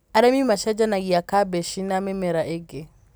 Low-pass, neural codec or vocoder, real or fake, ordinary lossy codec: none; none; real; none